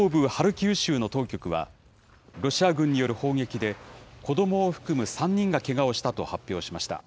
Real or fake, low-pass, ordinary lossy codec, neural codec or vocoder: real; none; none; none